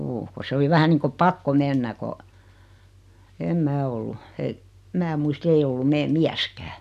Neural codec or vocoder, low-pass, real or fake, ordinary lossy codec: none; 14.4 kHz; real; none